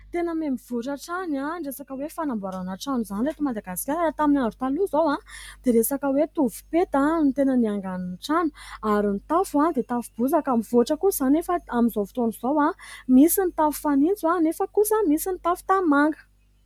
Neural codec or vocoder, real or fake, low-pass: none; real; 19.8 kHz